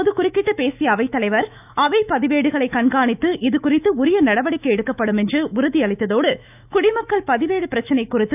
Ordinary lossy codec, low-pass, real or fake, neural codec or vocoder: none; 3.6 kHz; fake; autoencoder, 48 kHz, 128 numbers a frame, DAC-VAE, trained on Japanese speech